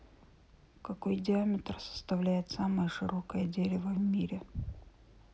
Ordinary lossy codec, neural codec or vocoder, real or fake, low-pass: none; none; real; none